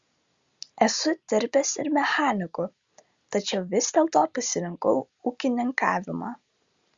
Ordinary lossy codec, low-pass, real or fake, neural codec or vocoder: Opus, 64 kbps; 7.2 kHz; real; none